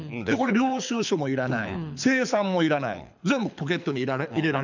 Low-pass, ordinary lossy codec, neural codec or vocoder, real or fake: 7.2 kHz; none; codec, 24 kHz, 6 kbps, HILCodec; fake